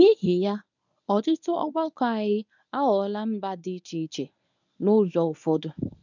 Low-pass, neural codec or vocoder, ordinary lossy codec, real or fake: 7.2 kHz; codec, 24 kHz, 0.9 kbps, WavTokenizer, medium speech release version 1; none; fake